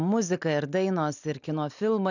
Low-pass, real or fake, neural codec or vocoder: 7.2 kHz; real; none